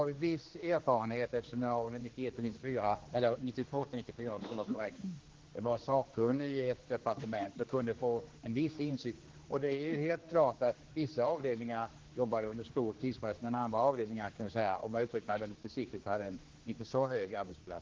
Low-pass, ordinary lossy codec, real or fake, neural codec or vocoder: 7.2 kHz; Opus, 16 kbps; fake; codec, 16 kHz, 2 kbps, X-Codec, HuBERT features, trained on general audio